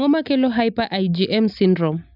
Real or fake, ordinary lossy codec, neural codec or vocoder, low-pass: real; AAC, 48 kbps; none; 5.4 kHz